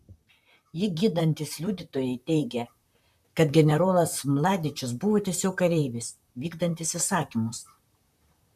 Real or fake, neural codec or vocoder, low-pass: fake; vocoder, 44.1 kHz, 128 mel bands, Pupu-Vocoder; 14.4 kHz